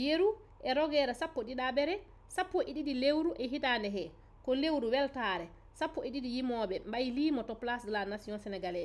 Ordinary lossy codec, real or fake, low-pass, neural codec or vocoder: none; real; none; none